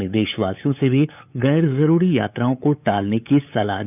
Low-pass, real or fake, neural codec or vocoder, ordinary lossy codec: 3.6 kHz; fake; codec, 16 kHz, 8 kbps, FunCodec, trained on LibriTTS, 25 frames a second; none